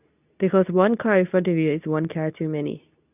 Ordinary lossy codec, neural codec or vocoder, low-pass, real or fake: none; codec, 24 kHz, 0.9 kbps, WavTokenizer, medium speech release version 2; 3.6 kHz; fake